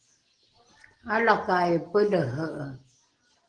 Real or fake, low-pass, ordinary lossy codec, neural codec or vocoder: real; 9.9 kHz; Opus, 16 kbps; none